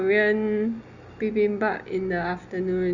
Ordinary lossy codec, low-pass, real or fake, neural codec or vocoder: Opus, 64 kbps; 7.2 kHz; real; none